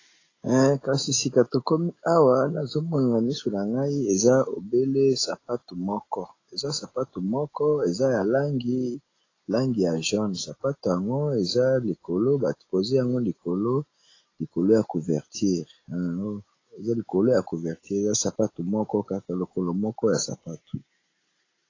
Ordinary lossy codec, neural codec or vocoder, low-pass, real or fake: AAC, 32 kbps; none; 7.2 kHz; real